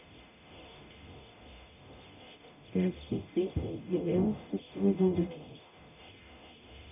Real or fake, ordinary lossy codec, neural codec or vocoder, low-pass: fake; none; codec, 44.1 kHz, 0.9 kbps, DAC; 3.6 kHz